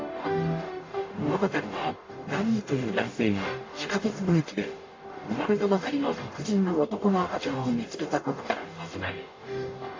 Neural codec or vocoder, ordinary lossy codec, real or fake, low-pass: codec, 44.1 kHz, 0.9 kbps, DAC; AAC, 32 kbps; fake; 7.2 kHz